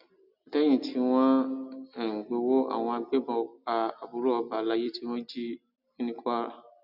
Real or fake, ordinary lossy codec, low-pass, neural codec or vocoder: real; none; 5.4 kHz; none